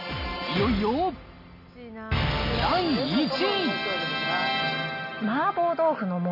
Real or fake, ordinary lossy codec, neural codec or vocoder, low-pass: real; none; none; 5.4 kHz